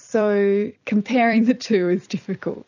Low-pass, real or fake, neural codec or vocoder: 7.2 kHz; fake; vocoder, 44.1 kHz, 128 mel bands every 256 samples, BigVGAN v2